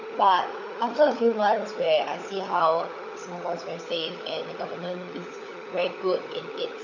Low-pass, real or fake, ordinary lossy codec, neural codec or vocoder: 7.2 kHz; fake; none; codec, 16 kHz, 16 kbps, FunCodec, trained on LibriTTS, 50 frames a second